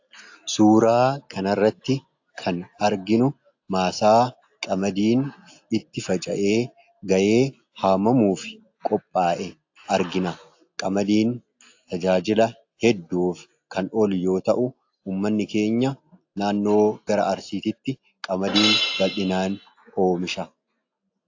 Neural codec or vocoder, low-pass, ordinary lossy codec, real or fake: none; 7.2 kHz; AAC, 48 kbps; real